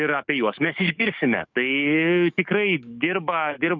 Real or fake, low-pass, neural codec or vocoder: fake; 7.2 kHz; codec, 44.1 kHz, 7.8 kbps, DAC